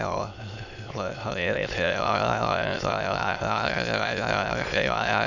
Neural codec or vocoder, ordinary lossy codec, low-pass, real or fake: autoencoder, 22.05 kHz, a latent of 192 numbers a frame, VITS, trained on many speakers; none; 7.2 kHz; fake